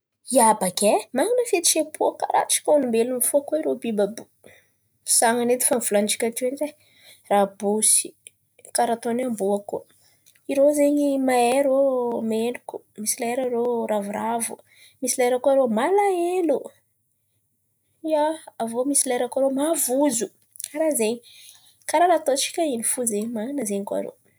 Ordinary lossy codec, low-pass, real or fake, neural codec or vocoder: none; none; real; none